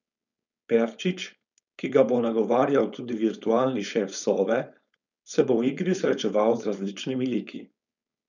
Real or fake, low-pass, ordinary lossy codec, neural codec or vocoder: fake; 7.2 kHz; none; codec, 16 kHz, 4.8 kbps, FACodec